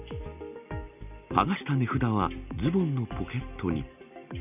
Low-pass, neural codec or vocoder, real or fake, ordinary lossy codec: 3.6 kHz; none; real; none